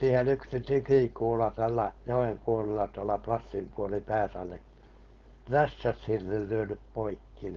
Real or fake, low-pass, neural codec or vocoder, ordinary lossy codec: fake; 7.2 kHz; codec, 16 kHz, 4.8 kbps, FACodec; Opus, 24 kbps